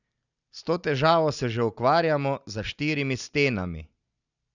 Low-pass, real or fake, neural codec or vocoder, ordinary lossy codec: 7.2 kHz; real; none; none